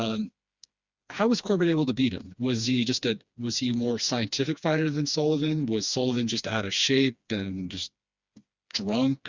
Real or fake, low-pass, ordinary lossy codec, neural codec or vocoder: fake; 7.2 kHz; Opus, 64 kbps; codec, 16 kHz, 2 kbps, FreqCodec, smaller model